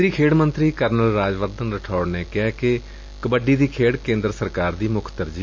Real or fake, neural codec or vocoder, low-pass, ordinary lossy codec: real; none; 7.2 kHz; MP3, 48 kbps